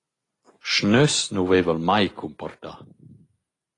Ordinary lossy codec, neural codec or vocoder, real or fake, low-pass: AAC, 32 kbps; none; real; 10.8 kHz